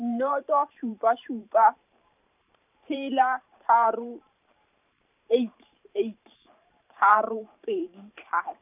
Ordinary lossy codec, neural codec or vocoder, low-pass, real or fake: none; vocoder, 44.1 kHz, 128 mel bands every 512 samples, BigVGAN v2; 3.6 kHz; fake